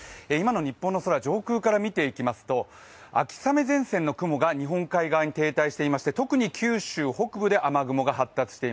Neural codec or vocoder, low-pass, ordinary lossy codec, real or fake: none; none; none; real